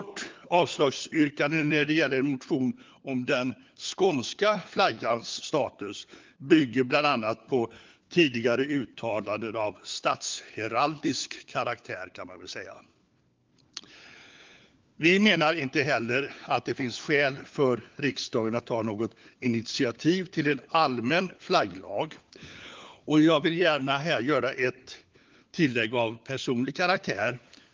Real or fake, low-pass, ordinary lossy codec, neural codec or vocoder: fake; 7.2 kHz; Opus, 32 kbps; codec, 16 kHz, 4 kbps, FunCodec, trained on LibriTTS, 50 frames a second